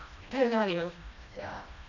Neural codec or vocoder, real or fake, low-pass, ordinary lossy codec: codec, 16 kHz, 1 kbps, FreqCodec, smaller model; fake; 7.2 kHz; none